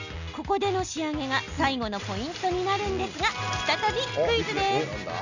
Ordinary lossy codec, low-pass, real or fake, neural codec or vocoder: none; 7.2 kHz; real; none